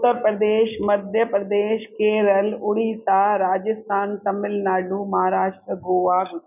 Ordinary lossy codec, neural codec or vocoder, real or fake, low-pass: none; none; real; 3.6 kHz